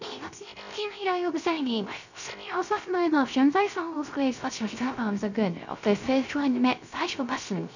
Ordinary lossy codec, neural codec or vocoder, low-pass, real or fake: none; codec, 16 kHz, 0.3 kbps, FocalCodec; 7.2 kHz; fake